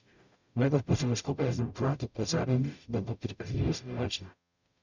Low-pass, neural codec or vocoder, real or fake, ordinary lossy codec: 7.2 kHz; codec, 44.1 kHz, 0.9 kbps, DAC; fake; none